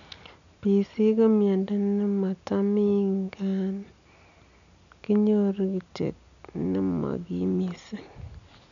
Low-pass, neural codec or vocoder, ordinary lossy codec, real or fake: 7.2 kHz; none; none; real